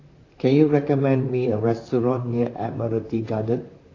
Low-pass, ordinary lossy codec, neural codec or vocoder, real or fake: 7.2 kHz; AAC, 32 kbps; vocoder, 44.1 kHz, 128 mel bands, Pupu-Vocoder; fake